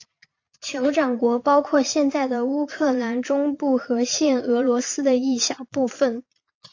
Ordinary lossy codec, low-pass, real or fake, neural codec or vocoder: AAC, 48 kbps; 7.2 kHz; fake; vocoder, 22.05 kHz, 80 mel bands, Vocos